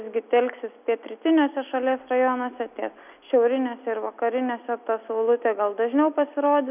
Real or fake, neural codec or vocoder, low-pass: real; none; 3.6 kHz